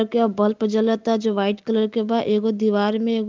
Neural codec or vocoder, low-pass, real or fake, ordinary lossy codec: none; 7.2 kHz; real; Opus, 32 kbps